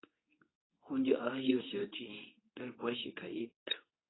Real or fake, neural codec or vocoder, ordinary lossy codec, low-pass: fake; codec, 24 kHz, 0.9 kbps, WavTokenizer, medium speech release version 2; AAC, 16 kbps; 7.2 kHz